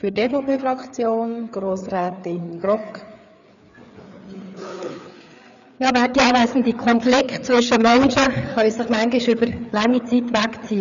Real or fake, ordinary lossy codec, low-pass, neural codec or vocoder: fake; none; 7.2 kHz; codec, 16 kHz, 4 kbps, FreqCodec, larger model